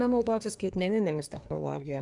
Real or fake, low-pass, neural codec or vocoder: fake; 10.8 kHz; codec, 24 kHz, 1 kbps, SNAC